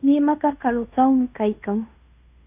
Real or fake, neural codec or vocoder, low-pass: fake; codec, 16 kHz in and 24 kHz out, 0.9 kbps, LongCat-Audio-Codec, fine tuned four codebook decoder; 3.6 kHz